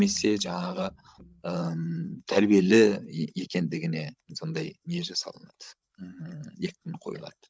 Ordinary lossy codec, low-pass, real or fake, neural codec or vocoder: none; none; fake; codec, 16 kHz, 16 kbps, FreqCodec, larger model